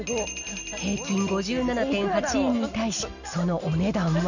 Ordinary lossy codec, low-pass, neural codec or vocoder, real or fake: none; 7.2 kHz; none; real